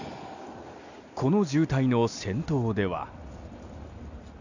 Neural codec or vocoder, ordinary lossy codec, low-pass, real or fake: none; none; 7.2 kHz; real